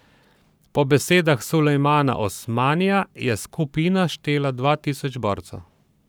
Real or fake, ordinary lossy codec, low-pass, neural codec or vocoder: fake; none; none; codec, 44.1 kHz, 7.8 kbps, Pupu-Codec